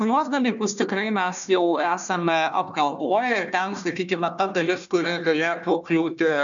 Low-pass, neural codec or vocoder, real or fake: 7.2 kHz; codec, 16 kHz, 1 kbps, FunCodec, trained on Chinese and English, 50 frames a second; fake